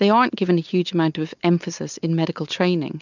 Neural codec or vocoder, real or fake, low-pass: none; real; 7.2 kHz